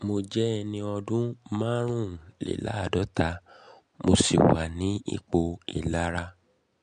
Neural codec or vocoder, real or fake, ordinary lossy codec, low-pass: none; real; MP3, 64 kbps; 9.9 kHz